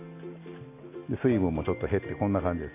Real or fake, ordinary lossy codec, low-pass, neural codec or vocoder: real; AAC, 24 kbps; 3.6 kHz; none